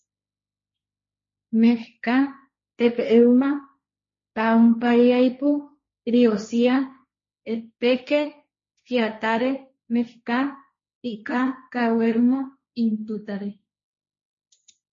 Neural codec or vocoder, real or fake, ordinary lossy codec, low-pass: codec, 16 kHz, 1.1 kbps, Voila-Tokenizer; fake; MP3, 32 kbps; 7.2 kHz